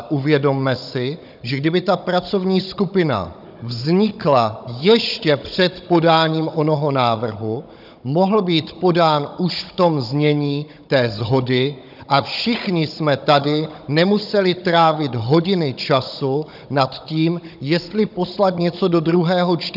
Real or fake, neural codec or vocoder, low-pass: fake; codec, 16 kHz, 16 kbps, FunCodec, trained on Chinese and English, 50 frames a second; 5.4 kHz